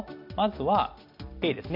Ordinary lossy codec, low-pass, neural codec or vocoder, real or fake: none; 5.4 kHz; none; real